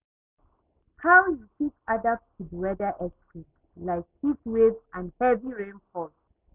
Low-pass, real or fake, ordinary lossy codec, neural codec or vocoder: 3.6 kHz; real; none; none